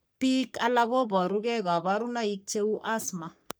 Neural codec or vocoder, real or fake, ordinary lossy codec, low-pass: codec, 44.1 kHz, 3.4 kbps, Pupu-Codec; fake; none; none